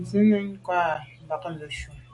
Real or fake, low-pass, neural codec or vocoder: real; 10.8 kHz; none